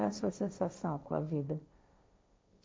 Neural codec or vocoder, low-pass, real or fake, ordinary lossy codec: codec, 16 kHz, 1.1 kbps, Voila-Tokenizer; none; fake; none